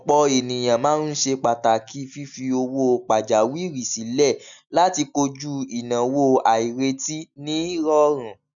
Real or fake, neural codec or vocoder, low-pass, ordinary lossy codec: real; none; 7.2 kHz; none